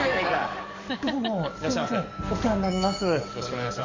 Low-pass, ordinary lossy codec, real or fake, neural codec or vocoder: 7.2 kHz; MP3, 64 kbps; fake; codec, 44.1 kHz, 7.8 kbps, DAC